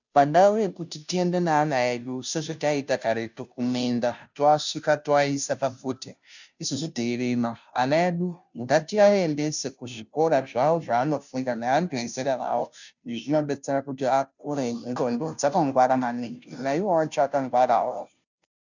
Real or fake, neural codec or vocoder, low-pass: fake; codec, 16 kHz, 0.5 kbps, FunCodec, trained on Chinese and English, 25 frames a second; 7.2 kHz